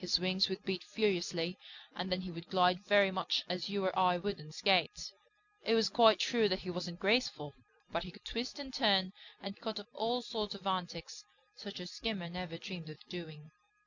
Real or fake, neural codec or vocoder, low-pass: real; none; 7.2 kHz